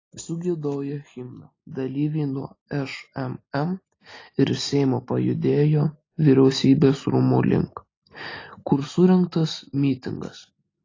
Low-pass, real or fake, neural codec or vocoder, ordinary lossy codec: 7.2 kHz; real; none; AAC, 32 kbps